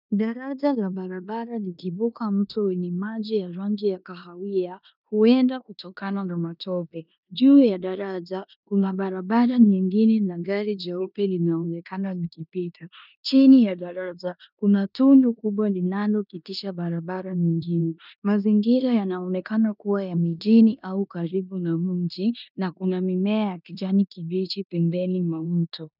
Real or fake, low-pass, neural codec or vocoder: fake; 5.4 kHz; codec, 16 kHz in and 24 kHz out, 0.9 kbps, LongCat-Audio-Codec, four codebook decoder